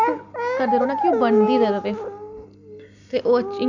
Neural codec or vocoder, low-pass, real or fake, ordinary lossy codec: none; 7.2 kHz; real; none